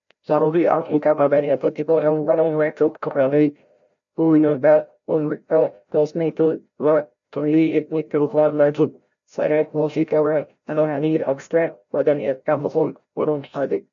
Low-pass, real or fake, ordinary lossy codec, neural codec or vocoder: 7.2 kHz; fake; none; codec, 16 kHz, 0.5 kbps, FreqCodec, larger model